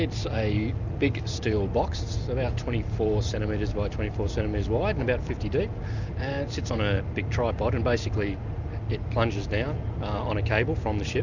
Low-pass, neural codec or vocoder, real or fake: 7.2 kHz; none; real